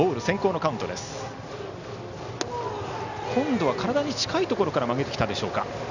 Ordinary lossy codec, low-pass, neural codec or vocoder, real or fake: none; 7.2 kHz; none; real